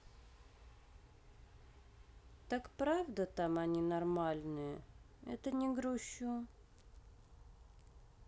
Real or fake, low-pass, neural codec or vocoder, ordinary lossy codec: real; none; none; none